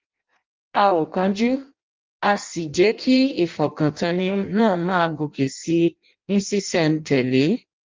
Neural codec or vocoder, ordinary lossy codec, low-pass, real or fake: codec, 16 kHz in and 24 kHz out, 0.6 kbps, FireRedTTS-2 codec; Opus, 24 kbps; 7.2 kHz; fake